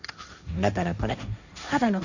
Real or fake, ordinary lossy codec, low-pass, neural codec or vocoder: fake; none; 7.2 kHz; codec, 16 kHz, 1.1 kbps, Voila-Tokenizer